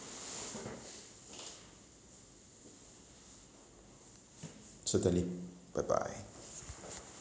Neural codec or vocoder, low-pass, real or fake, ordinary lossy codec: none; none; real; none